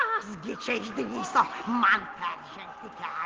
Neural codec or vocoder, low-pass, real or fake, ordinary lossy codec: none; 7.2 kHz; real; Opus, 16 kbps